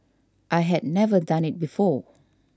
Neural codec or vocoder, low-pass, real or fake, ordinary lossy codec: none; none; real; none